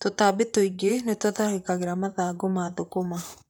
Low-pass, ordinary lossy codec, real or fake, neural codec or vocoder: none; none; fake; vocoder, 44.1 kHz, 128 mel bands, Pupu-Vocoder